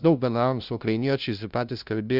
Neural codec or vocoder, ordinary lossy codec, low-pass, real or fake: codec, 16 kHz, 0.5 kbps, FunCodec, trained on LibriTTS, 25 frames a second; Opus, 64 kbps; 5.4 kHz; fake